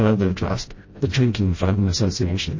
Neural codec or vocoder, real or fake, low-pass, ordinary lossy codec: codec, 16 kHz, 0.5 kbps, FreqCodec, smaller model; fake; 7.2 kHz; MP3, 32 kbps